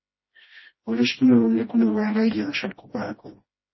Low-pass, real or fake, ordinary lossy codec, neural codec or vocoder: 7.2 kHz; fake; MP3, 24 kbps; codec, 16 kHz, 1 kbps, FreqCodec, smaller model